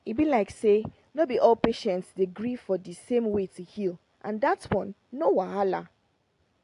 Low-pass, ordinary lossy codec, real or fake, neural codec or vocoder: 10.8 kHz; AAC, 48 kbps; real; none